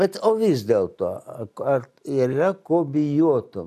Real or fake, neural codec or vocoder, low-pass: real; none; 14.4 kHz